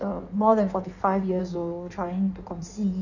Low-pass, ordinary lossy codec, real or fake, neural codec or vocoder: 7.2 kHz; none; fake; codec, 16 kHz in and 24 kHz out, 1.1 kbps, FireRedTTS-2 codec